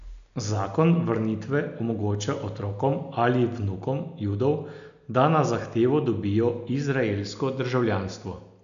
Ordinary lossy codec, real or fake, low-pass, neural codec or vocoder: AAC, 96 kbps; real; 7.2 kHz; none